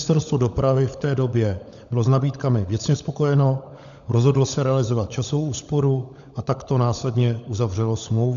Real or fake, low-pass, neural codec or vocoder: fake; 7.2 kHz; codec, 16 kHz, 16 kbps, FunCodec, trained on LibriTTS, 50 frames a second